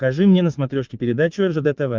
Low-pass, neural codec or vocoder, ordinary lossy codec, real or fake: 7.2 kHz; vocoder, 22.05 kHz, 80 mel bands, Vocos; Opus, 24 kbps; fake